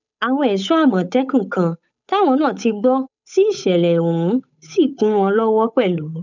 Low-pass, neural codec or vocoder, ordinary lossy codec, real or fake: 7.2 kHz; codec, 16 kHz, 8 kbps, FunCodec, trained on Chinese and English, 25 frames a second; none; fake